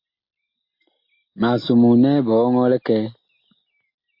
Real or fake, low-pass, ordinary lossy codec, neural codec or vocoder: real; 5.4 kHz; MP3, 32 kbps; none